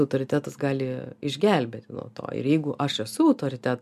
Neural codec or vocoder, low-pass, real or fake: none; 14.4 kHz; real